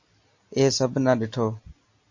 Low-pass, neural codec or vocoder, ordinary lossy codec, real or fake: 7.2 kHz; none; MP3, 48 kbps; real